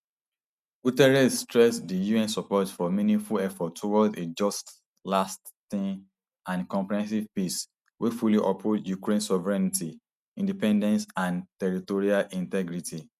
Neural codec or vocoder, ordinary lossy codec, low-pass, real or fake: none; none; 14.4 kHz; real